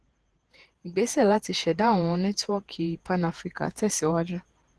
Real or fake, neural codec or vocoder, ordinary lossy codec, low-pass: fake; vocoder, 48 kHz, 128 mel bands, Vocos; Opus, 16 kbps; 10.8 kHz